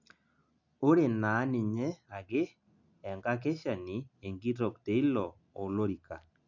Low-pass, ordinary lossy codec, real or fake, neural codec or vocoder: 7.2 kHz; none; real; none